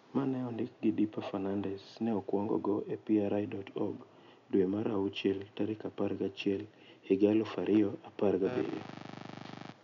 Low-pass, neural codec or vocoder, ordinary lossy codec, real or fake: 7.2 kHz; none; none; real